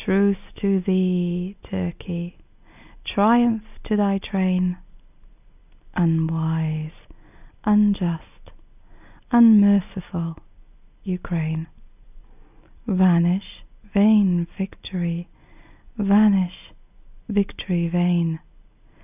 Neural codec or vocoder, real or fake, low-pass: none; real; 3.6 kHz